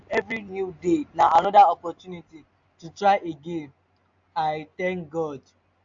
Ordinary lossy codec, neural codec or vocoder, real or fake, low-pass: AAC, 64 kbps; none; real; 7.2 kHz